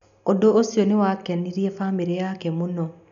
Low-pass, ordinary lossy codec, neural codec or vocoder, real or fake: 7.2 kHz; none; none; real